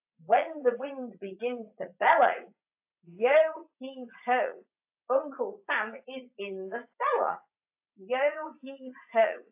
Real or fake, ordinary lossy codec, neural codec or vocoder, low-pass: fake; AAC, 32 kbps; vocoder, 44.1 kHz, 80 mel bands, Vocos; 3.6 kHz